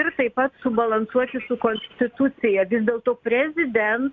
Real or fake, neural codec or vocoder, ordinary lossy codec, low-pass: real; none; MP3, 96 kbps; 7.2 kHz